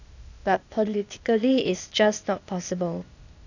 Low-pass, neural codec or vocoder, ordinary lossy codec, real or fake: 7.2 kHz; codec, 16 kHz, 0.8 kbps, ZipCodec; none; fake